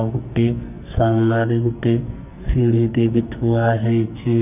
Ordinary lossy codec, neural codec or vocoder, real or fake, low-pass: none; codec, 44.1 kHz, 2.6 kbps, SNAC; fake; 3.6 kHz